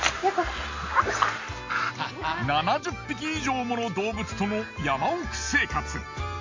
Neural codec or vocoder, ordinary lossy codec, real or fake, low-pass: none; MP3, 48 kbps; real; 7.2 kHz